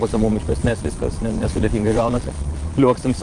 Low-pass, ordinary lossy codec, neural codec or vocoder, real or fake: 9.9 kHz; Opus, 24 kbps; vocoder, 22.05 kHz, 80 mel bands, Vocos; fake